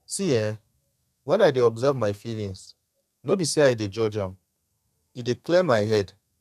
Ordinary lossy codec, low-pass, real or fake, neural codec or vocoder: none; 14.4 kHz; fake; codec, 32 kHz, 1.9 kbps, SNAC